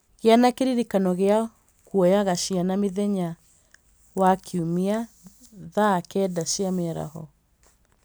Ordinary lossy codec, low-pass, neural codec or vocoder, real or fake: none; none; none; real